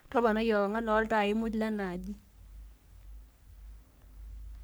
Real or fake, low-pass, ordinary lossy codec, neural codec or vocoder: fake; none; none; codec, 44.1 kHz, 3.4 kbps, Pupu-Codec